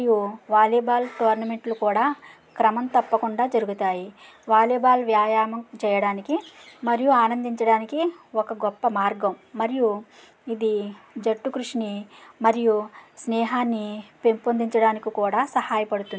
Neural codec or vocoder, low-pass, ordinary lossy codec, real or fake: none; none; none; real